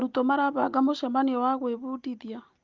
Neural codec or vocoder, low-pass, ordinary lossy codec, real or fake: none; 7.2 kHz; Opus, 32 kbps; real